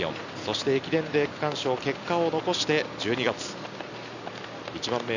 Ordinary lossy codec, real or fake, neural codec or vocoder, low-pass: none; real; none; 7.2 kHz